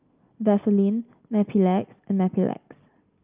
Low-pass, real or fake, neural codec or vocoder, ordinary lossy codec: 3.6 kHz; real; none; Opus, 24 kbps